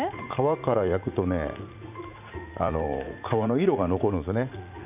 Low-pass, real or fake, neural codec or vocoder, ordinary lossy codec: 3.6 kHz; fake; vocoder, 44.1 kHz, 80 mel bands, Vocos; none